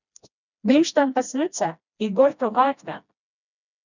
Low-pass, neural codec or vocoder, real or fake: 7.2 kHz; codec, 16 kHz, 1 kbps, FreqCodec, smaller model; fake